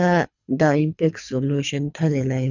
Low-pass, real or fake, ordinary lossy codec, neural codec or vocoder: 7.2 kHz; fake; none; codec, 24 kHz, 3 kbps, HILCodec